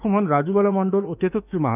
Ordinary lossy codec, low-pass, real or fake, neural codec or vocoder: none; 3.6 kHz; fake; autoencoder, 48 kHz, 32 numbers a frame, DAC-VAE, trained on Japanese speech